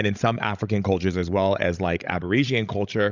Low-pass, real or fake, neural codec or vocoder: 7.2 kHz; fake; codec, 16 kHz, 16 kbps, FreqCodec, larger model